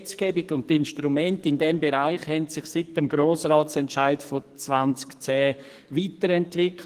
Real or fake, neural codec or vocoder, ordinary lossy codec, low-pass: fake; codec, 44.1 kHz, 2.6 kbps, SNAC; Opus, 24 kbps; 14.4 kHz